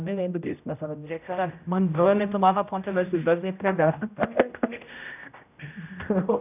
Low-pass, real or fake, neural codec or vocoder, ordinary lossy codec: 3.6 kHz; fake; codec, 16 kHz, 0.5 kbps, X-Codec, HuBERT features, trained on general audio; none